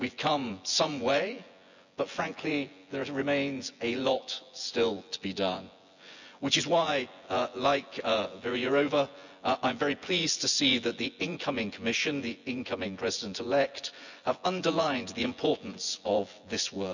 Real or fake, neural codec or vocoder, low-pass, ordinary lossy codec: fake; vocoder, 24 kHz, 100 mel bands, Vocos; 7.2 kHz; none